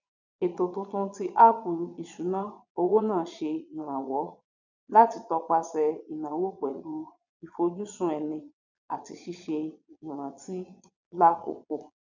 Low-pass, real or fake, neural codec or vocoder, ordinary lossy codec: 7.2 kHz; fake; vocoder, 22.05 kHz, 80 mel bands, Vocos; none